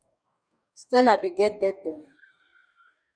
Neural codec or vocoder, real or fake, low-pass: codec, 44.1 kHz, 2.6 kbps, DAC; fake; 9.9 kHz